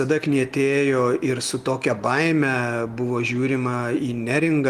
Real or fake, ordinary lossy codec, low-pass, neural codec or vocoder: real; Opus, 24 kbps; 14.4 kHz; none